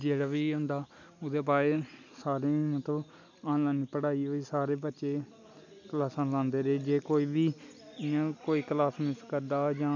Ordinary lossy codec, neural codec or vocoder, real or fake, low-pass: none; none; real; 7.2 kHz